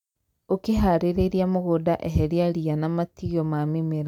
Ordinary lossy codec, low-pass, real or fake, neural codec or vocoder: none; 19.8 kHz; real; none